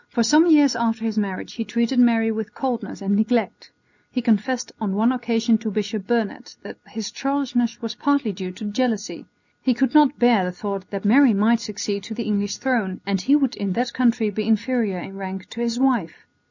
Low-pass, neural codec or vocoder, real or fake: 7.2 kHz; none; real